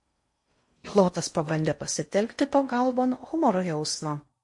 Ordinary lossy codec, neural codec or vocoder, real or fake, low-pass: MP3, 48 kbps; codec, 16 kHz in and 24 kHz out, 0.6 kbps, FocalCodec, streaming, 4096 codes; fake; 10.8 kHz